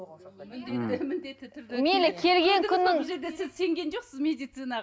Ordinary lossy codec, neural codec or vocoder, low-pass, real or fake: none; none; none; real